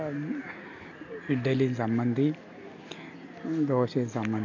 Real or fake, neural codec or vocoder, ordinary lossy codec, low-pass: real; none; AAC, 48 kbps; 7.2 kHz